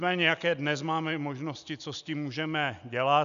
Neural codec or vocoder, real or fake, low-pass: none; real; 7.2 kHz